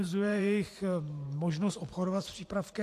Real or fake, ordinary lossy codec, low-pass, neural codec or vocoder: fake; AAC, 64 kbps; 14.4 kHz; vocoder, 44.1 kHz, 128 mel bands every 512 samples, BigVGAN v2